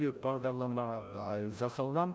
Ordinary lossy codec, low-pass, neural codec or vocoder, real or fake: none; none; codec, 16 kHz, 0.5 kbps, FreqCodec, larger model; fake